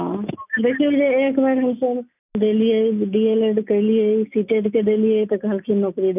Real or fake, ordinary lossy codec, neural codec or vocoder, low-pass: real; none; none; 3.6 kHz